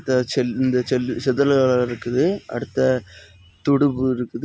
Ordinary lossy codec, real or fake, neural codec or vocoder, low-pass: none; real; none; none